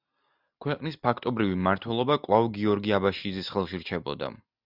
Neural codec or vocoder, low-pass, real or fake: none; 5.4 kHz; real